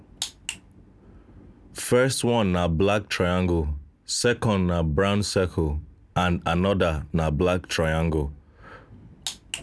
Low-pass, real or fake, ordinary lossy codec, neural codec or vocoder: none; real; none; none